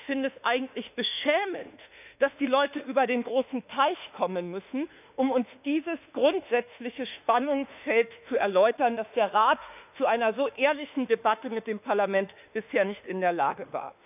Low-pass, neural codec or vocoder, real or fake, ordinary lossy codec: 3.6 kHz; autoencoder, 48 kHz, 32 numbers a frame, DAC-VAE, trained on Japanese speech; fake; none